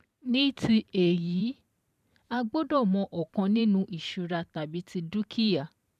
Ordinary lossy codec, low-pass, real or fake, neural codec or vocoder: none; 14.4 kHz; fake; vocoder, 44.1 kHz, 128 mel bands every 512 samples, BigVGAN v2